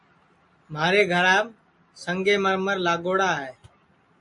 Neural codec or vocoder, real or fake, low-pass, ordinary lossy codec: none; real; 10.8 kHz; MP3, 48 kbps